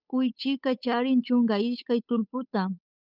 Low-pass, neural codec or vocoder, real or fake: 5.4 kHz; codec, 16 kHz, 8 kbps, FunCodec, trained on Chinese and English, 25 frames a second; fake